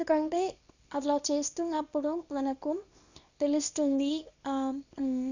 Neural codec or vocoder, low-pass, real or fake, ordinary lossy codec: codec, 24 kHz, 0.9 kbps, WavTokenizer, small release; 7.2 kHz; fake; none